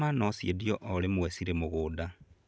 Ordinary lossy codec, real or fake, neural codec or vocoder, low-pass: none; real; none; none